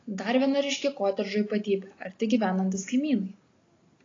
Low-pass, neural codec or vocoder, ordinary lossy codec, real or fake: 7.2 kHz; none; AAC, 32 kbps; real